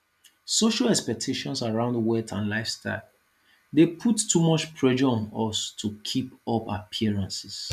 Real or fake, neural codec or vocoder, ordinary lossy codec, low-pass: real; none; none; 14.4 kHz